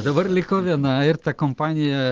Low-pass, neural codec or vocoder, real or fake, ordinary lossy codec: 7.2 kHz; none; real; Opus, 16 kbps